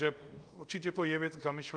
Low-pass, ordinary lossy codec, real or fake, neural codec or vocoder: 9.9 kHz; Opus, 32 kbps; fake; codec, 24 kHz, 0.5 kbps, DualCodec